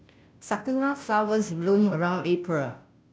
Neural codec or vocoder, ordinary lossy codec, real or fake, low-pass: codec, 16 kHz, 0.5 kbps, FunCodec, trained on Chinese and English, 25 frames a second; none; fake; none